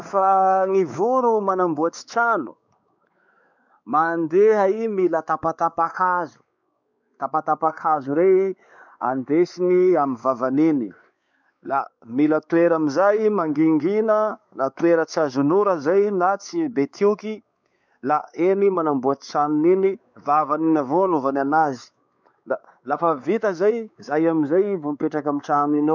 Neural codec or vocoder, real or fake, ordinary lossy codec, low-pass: codec, 16 kHz, 4 kbps, X-Codec, WavLM features, trained on Multilingual LibriSpeech; fake; none; 7.2 kHz